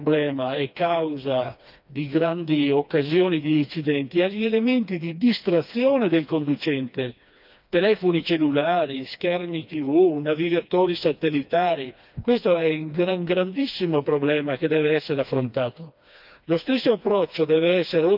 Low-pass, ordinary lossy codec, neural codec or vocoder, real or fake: 5.4 kHz; none; codec, 16 kHz, 2 kbps, FreqCodec, smaller model; fake